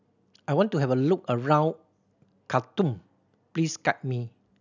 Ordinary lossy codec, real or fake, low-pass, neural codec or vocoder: none; real; 7.2 kHz; none